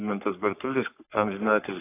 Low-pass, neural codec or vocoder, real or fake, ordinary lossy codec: 3.6 kHz; codec, 44.1 kHz, 3.4 kbps, Pupu-Codec; fake; AAC, 24 kbps